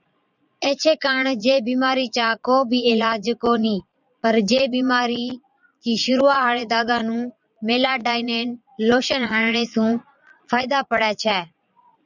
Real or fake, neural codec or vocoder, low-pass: fake; vocoder, 22.05 kHz, 80 mel bands, Vocos; 7.2 kHz